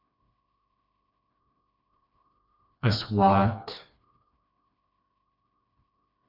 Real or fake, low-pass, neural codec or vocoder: fake; 5.4 kHz; codec, 16 kHz, 2 kbps, FreqCodec, smaller model